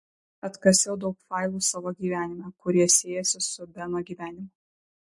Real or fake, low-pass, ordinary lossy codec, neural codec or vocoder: real; 10.8 kHz; MP3, 48 kbps; none